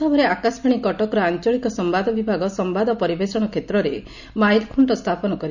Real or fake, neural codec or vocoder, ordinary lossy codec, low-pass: real; none; none; 7.2 kHz